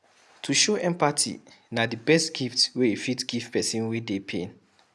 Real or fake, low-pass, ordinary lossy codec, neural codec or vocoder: real; none; none; none